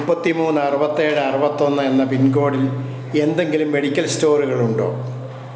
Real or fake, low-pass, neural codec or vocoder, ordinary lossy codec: real; none; none; none